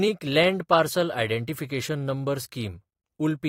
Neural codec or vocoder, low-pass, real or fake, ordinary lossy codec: vocoder, 44.1 kHz, 128 mel bands every 256 samples, BigVGAN v2; 19.8 kHz; fake; AAC, 48 kbps